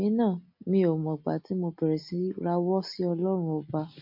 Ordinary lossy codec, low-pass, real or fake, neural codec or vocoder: MP3, 32 kbps; 5.4 kHz; real; none